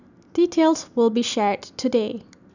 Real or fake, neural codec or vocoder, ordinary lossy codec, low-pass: real; none; none; 7.2 kHz